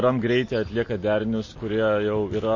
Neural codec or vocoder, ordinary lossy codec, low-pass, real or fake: none; MP3, 32 kbps; 7.2 kHz; real